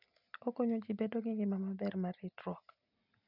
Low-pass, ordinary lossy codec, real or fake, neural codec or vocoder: 5.4 kHz; none; real; none